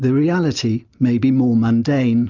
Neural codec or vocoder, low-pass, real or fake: none; 7.2 kHz; real